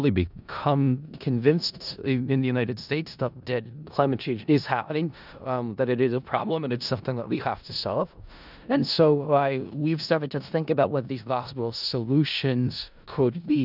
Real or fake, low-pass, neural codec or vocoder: fake; 5.4 kHz; codec, 16 kHz in and 24 kHz out, 0.4 kbps, LongCat-Audio-Codec, four codebook decoder